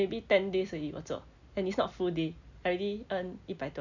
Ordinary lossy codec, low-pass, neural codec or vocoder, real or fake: none; 7.2 kHz; none; real